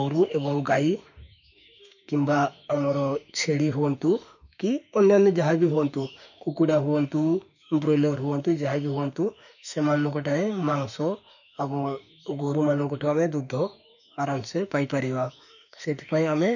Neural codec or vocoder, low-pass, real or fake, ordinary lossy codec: autoencoder, 48 kHz, 32 numbers a frame, DAC-VAE, trained on Japanese speech; 7.2 kHz; fake; none